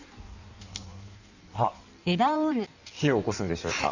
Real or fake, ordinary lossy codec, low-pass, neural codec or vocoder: fake; none; 7.2 kHz; codec, 16 kHz in and 24 kHz out, 1.1 kbps, FireRedTTS-2 codec